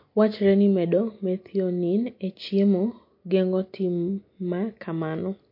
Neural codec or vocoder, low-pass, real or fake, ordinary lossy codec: none; 5.4 kHz; real; MP3, 32 kbps